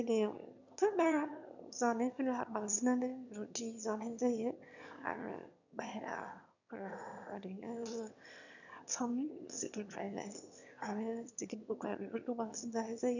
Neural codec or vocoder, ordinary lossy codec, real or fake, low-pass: autoencoder, 22.05 kHz, a latent of 192 numbers a frame, VITS, trained on one speaker; none; fake; 7.2 kHz